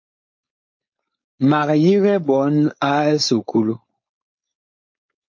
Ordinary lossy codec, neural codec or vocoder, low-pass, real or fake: MP3, 32 kbps; codec, 16 kHz, 4.8 kbps, FACodec; 7.2 kHz; fake